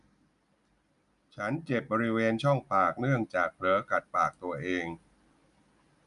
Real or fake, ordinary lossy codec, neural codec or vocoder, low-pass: real; none; none; 10.8 kHz